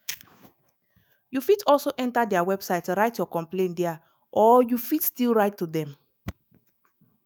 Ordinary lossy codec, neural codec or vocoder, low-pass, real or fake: none; autoencoder, 48 kHz, 128 numbers a frame, DAC-VAE, trained on Japanese speech; none; fake